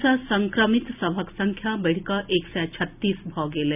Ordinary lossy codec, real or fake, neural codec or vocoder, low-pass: none; real; none; 3.6 kHz